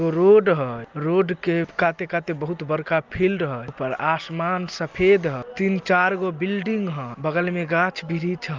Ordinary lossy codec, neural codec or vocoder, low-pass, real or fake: Opus, 24 kbps; none; 7.2 kHz; real